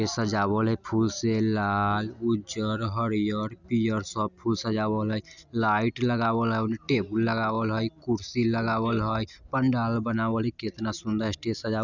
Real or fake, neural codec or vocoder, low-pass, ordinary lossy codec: real; none; 7.2 kHz; none